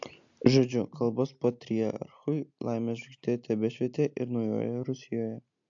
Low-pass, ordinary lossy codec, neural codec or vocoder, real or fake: 7.2 kHz; AAC, 64 kbps; none; real